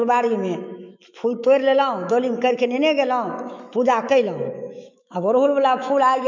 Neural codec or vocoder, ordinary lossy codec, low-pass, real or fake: autoencoder, 48 kHz, 128 numbers a frame, DAC-VAE, trained on Japanese speech; MP3, 64 kbps; 7.2 kHz; fake